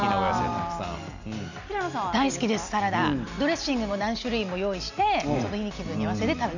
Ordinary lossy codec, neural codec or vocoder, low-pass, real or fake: none; none; 7.2 kHz; real